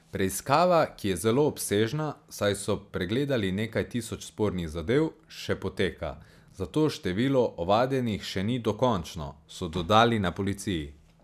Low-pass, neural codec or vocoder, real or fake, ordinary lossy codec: 14.4 kHz; none; real; none